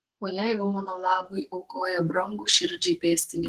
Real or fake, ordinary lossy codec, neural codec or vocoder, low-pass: fake; Opus, 16 kbps; codec, 32 kHz, 1.9 kbps, SNAC; 14.4 kHz